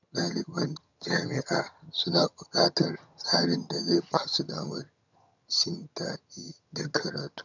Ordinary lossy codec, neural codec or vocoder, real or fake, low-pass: none; vocoder, 22.05 kHz, 80 mel bands, HiFi-GAN; fake; 7.2 kHz